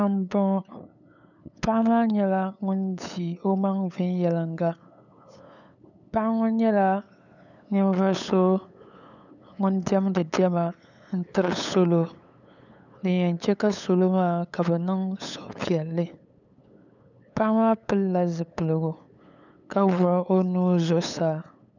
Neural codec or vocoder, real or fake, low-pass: codec, 16 kHz, 8 kbps, FunCodec, trained on LibriTTS, 25 frames a second; fake; 7.2 kHz